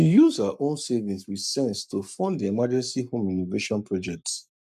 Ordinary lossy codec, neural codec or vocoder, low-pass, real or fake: none; codec, 44.1 kHz, 7.8 kbps, Pupu-Codec; 14.4 kHz; fake